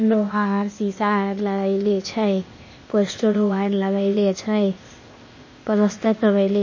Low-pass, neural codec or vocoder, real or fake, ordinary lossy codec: 7.2 kHz; codec, 16 kHz, 0.8 kbps, ZipCodec; fake; MP3, 32 kbps